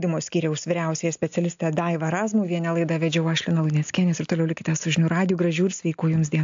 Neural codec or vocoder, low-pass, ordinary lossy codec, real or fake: none; 7.2 kHz; AAC, 64 kbps; real